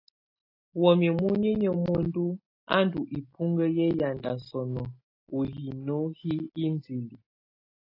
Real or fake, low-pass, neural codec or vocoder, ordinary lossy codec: real; 5.4 kHz; none; AAC, 32 kbps